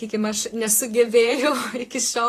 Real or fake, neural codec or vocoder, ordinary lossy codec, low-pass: fake; vocoder, 44.1 kHz, 128 mel bands, Pupu-Vocoder; AAC, 48 kbps; 14.4 kHz